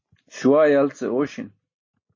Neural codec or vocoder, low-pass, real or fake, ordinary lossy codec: none; 7.2 kHz; real; MP3, 32 kbps